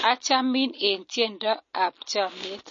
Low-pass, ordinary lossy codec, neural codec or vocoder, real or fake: 7.2 kHz; MP3, 32 kbps; none; real